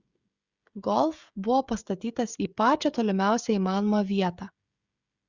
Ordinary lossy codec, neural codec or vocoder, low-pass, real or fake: Opus, 64 kbps; codec, 16 kHz, 16 kbps, FreqCodec, smaller model; 7.2 kHz; fake